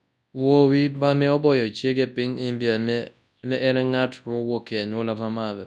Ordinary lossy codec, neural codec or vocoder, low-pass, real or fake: none; codec, 24 kHz, 0.9 kbps, WavTokenizer, large speech release; none; fake